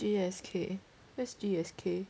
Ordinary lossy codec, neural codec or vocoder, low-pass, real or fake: none; none; none; real